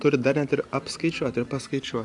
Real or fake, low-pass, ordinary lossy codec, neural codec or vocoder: real; 10.8 kHz; AAC, 64 kbps; none